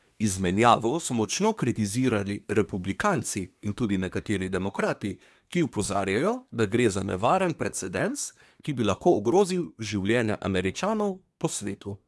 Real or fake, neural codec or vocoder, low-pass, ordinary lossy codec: fake; codec, 24 kHz, 1 kbps, SNAC; none; none